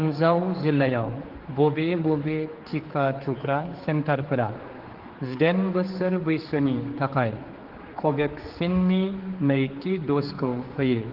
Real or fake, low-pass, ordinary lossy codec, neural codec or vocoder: fake; 5.4 kHz; Opus, 16 kbps; codec, 16 kHz, 4 kbps, X-Codec, HuBERT features, trained on general audio